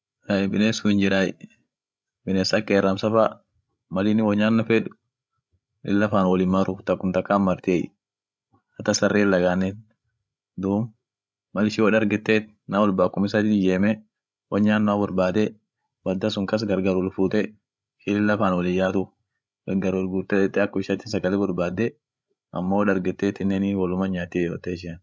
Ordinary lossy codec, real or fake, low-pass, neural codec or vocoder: none; fake; none; codec, 16 kHz, 16 kbps, FreqCodec, larger model